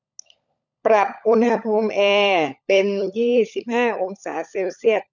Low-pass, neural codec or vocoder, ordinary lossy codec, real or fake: 7.2 kHz; codec, 16 kHz, 16 kbps, FunCodec, trained on LibriTTS, 50 frames a second; none; fake